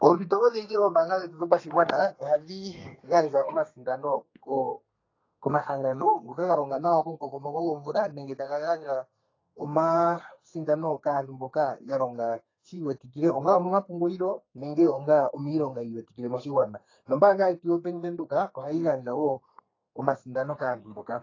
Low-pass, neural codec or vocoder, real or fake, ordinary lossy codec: 7.2 kHz; codec, 32 kHz, 1.9 kbps, SNAC; fake; AAC, 32 kbps